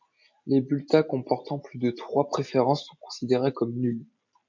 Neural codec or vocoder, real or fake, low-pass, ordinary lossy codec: none; real; 7.2 kHz; MP3, 64 kbps